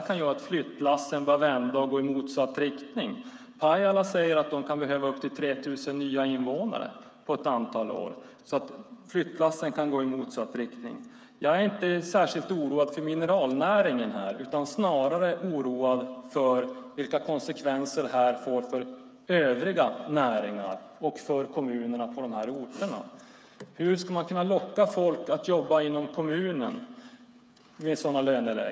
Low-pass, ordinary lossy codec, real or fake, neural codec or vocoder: none; none; fake; codec, 16 kHz, 8 kbps, FreqCodec, smaller model